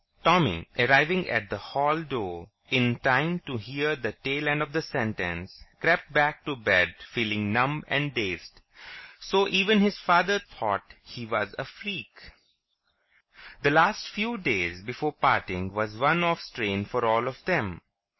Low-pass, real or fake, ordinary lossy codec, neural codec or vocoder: 7.2 kHz; real; MP3, 24 kbps; none